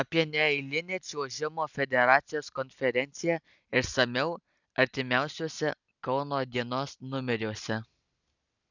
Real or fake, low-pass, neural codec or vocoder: real; 7.2 kHz; none